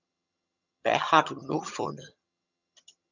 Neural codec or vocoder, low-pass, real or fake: vocoder, 22.05 kHz, 80 mel bands, HiFi-GAN; 7.2 kHz; fake